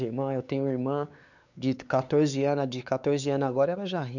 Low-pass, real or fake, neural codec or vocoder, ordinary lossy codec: 7.2 kHz; fake; codec, 16 kHz, 4 kbps, X-Codec, WavLM features, trained on Multilingual LibriSpeech; none